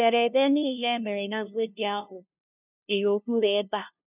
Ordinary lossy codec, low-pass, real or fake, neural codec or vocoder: none; 3.6 kHz; fake; codec, 16 kHz, 0.5 kbps, FunCodec, trained on LibriTTS, 25 frames a second